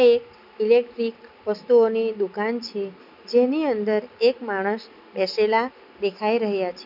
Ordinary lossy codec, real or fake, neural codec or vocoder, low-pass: none; real; none; 5.4 kHz